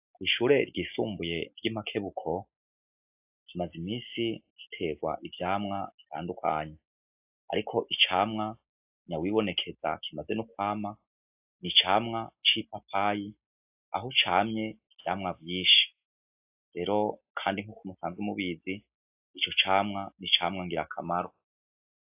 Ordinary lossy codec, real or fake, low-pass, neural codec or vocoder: AAC, 32 kbps; real; 3.6 kHz; none